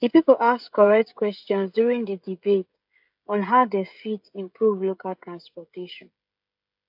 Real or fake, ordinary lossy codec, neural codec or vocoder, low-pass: fake; AAC, 48 kbps; codec, 16 kHz, 16 kbps, FreqCodec, smaller model; 5.4 kHz